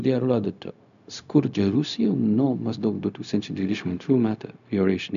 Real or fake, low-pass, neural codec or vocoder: fake; 7.2 kHz; codec, 16 kHz, 0.4 kbps, LongCat-Audio-Codec